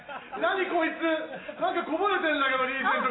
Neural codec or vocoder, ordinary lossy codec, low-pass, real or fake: none; AAC, 16 kbps; 7.2 kHz; real